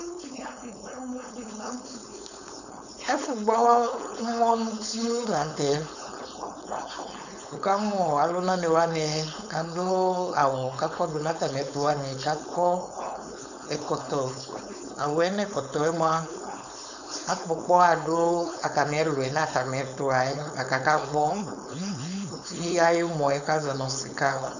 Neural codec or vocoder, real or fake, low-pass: codec, 16 kHz, 4.8 kbps, FACodec; fake; 7.2 kHz